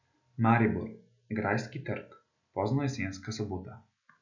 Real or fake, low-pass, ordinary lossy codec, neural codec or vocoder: real; 7.2 kHz; none; none